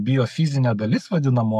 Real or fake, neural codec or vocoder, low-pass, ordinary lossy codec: fake; codec, 44.1 kHz, 7.8 kbps, Pupu-Codec; 14.4 kHz; MP3, 96 kbps